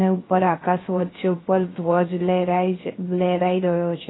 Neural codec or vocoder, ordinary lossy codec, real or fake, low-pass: codec, 16 kHz, 0.3 kbps, FocalCodec; AAC, 16 kbps; fake; 7.2 kHz